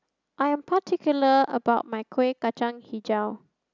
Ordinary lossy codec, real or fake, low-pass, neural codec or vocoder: none; real; 7.2 kHz; none